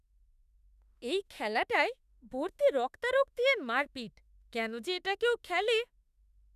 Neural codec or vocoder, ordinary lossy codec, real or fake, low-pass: autoencoder, 48 kHz, 32 numbers a frame, DAC-VAE, trained on Japanese speech; none; fake; 14.4 kHz